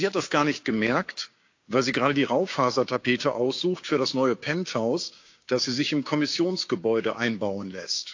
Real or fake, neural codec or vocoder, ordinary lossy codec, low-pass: fake; codec, 16 kHz, 6 kbps, DAC; AAC, 48 kbps; 7.2 kHz